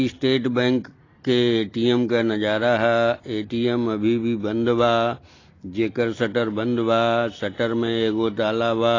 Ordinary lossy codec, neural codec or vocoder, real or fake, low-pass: AAC, 32 kbps; none; real; 7.2 kHz